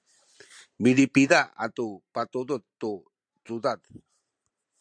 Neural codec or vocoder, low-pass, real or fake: vocoder, 44.1 kHz, 128 mel bands every 512 samples, BigVGAN v2; 9.9 kHz; fake